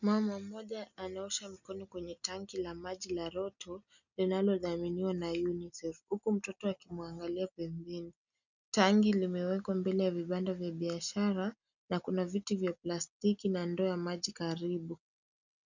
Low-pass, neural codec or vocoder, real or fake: 7.2 kHz; none; real